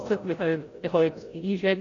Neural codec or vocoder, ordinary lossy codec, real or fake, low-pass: codec, 16 kHz, 0.5 kbps, FreqCodec, larger model; AAC, 32 kbps; fake; 7.2 kHz